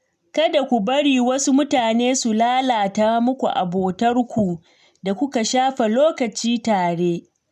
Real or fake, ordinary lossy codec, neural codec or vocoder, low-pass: real; none; none; 14.4 kHz